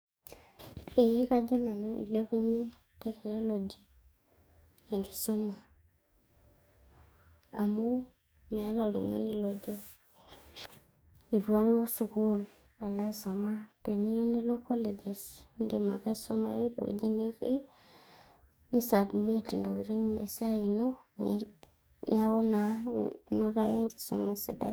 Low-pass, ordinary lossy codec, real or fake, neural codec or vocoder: none; none; fake; codec, 44.1 kHz, 2.6 kbps, DAC